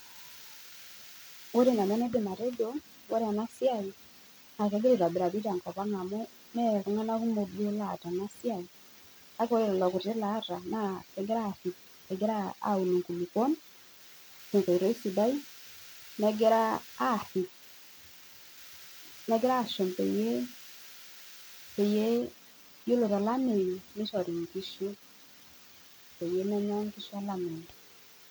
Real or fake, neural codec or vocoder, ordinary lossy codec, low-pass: real; none; none; none